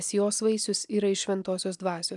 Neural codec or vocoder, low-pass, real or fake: none; 10.8 kHz; real